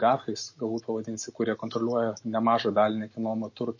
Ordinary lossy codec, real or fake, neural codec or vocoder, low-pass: MP3, 32 kbps; real; none; 7.2 kHz